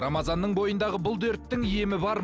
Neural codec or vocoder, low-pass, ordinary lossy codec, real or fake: none; none; none; real